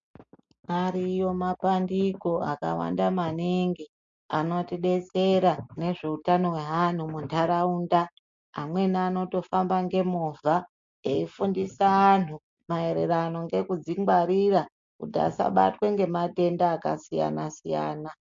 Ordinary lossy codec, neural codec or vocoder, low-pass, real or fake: MP3, 48 kbps; none; 7.2 kHz; real